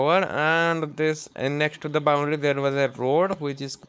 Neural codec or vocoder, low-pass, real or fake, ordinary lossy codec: codec, 16 kHz, 4.8 kbps, FACodec; none; fake; none